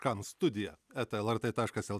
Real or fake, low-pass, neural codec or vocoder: real; 14.4 kHz; none